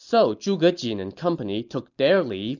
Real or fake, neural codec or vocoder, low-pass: real; none; 7.2 kHz